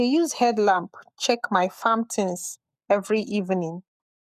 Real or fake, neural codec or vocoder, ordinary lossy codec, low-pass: fake; codec, 44.1 kHz, 7.8 kbps, Pupu-Codec; none; 14.4 kHz